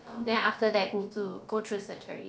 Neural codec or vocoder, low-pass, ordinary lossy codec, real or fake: codec, 16 kHz, about 1 kbps, DyCAST, with the encoder's durations; none; none; fake